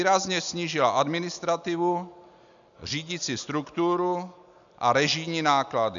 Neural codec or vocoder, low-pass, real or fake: none; 7.2 kHz; real